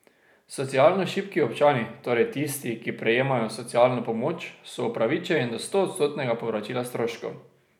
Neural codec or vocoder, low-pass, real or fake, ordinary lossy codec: none; 19.8 kHz; real; none